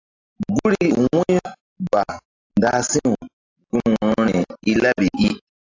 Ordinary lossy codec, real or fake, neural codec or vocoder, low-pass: AAC, 48 kbps; real; none; 7.2 kHz